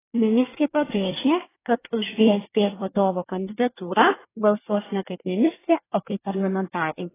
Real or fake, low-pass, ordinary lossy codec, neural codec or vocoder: fake; 3.6 kHz; AAC, 16 kbps; codec, 24 kHz, 1 kbps, SNAC